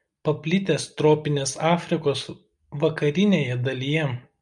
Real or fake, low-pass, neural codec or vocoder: real; 10.8 kHz; none